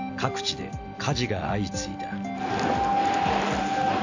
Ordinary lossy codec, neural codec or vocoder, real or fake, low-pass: AAC, 48 kbps; none; real; 7.2 kHz